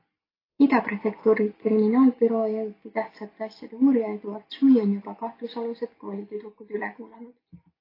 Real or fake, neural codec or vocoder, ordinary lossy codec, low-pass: real; none; AAC, 24 kbps; 5.4 kHz